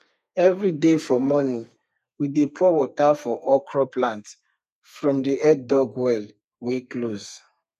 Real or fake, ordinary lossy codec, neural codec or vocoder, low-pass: fake; none; codec, 32 kHz, 1.9 kbps, SNAC; 14.4 kHz